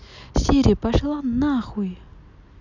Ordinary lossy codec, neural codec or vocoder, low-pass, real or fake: none; none; 7.2 kHz; real